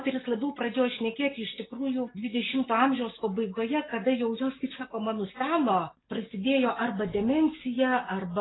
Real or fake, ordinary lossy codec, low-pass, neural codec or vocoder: real; AAC, 16 kbps; 7.2 kHz; none